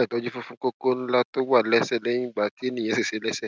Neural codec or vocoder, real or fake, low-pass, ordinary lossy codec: none; real; none; none